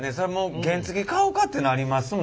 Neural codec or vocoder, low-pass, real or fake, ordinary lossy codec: none; none; real; none